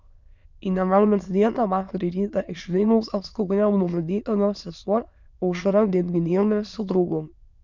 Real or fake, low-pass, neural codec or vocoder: fake; 7.2 kHz; autoencoder, 22.05 kHz, a latent of 192 numbers a frame, VITS, trained on many speakers